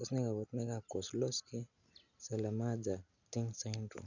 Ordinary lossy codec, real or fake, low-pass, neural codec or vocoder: none; real; 7.2 kHz; none